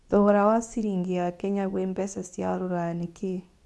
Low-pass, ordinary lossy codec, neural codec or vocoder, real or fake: none; none; codec, 24 kHz, 0.9 kbps, WavTokenizer, medium speech release version 2; fake